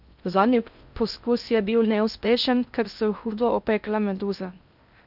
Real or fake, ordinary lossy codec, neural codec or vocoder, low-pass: fake; none; codec, 16 kHz in and 24 kHz out, 0.6 kbps, FocalCodec, streaming, 2048 codes; 5.4 kHz